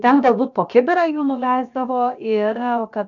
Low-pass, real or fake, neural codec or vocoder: 7.2 kHz; fake; codec, 16 kHz, about 1 kbps, DyCAST, with the encoder's durations